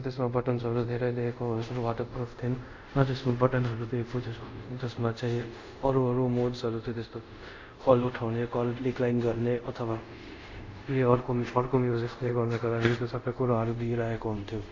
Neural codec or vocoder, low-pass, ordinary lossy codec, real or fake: codec, 24 kHz, 0.5 kbps, DualCodec; 7.2 kHz; MP3, 48 kbps; fake